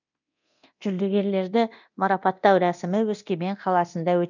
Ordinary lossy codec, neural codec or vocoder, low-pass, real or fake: none; codec, 24 kHz, 0.9 kbps, DualCodec; 7.2 kHz; fake